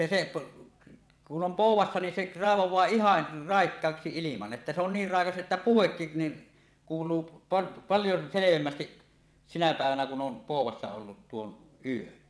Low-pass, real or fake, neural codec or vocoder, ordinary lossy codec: none; fake; vocoder, 22.05 kHz, 80 mel bands, WaveNeXt; none